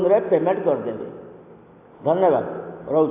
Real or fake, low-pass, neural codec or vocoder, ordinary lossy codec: real; 3.6 kHz; none; AAC, 24 kbps